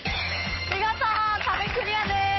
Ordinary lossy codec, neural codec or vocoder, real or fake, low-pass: MP3, 24 kbps; codec, 16 kHz, 8 kbps, FunCodec, trained on Chinese and English, 25 frames a second; fake; 7.2 kHz